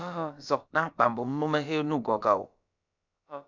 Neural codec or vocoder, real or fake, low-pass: codec, 16 kHz, about 1 kbps, DyCAST, with the encoder's durations; fake; 7.2 kHz